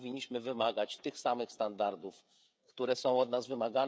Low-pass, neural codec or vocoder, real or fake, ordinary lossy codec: none; codec, 16 kHz, 16 kbps, FreqCodec, smaller model; fake; none